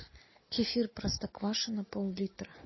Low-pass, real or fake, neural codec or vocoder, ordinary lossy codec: 7.2 kHz; real; none; MP3, 24 kbps